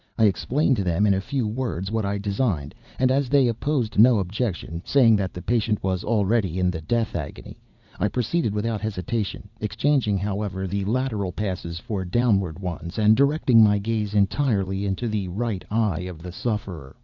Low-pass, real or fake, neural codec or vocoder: 7.2 kHz; fake; vocoder, 44.1 kHz, 80 mel bands, Vocos